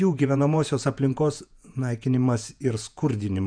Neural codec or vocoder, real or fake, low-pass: vocoder, 48 kHz, 128 mel bands, Vocos; fake; 9.9 kHz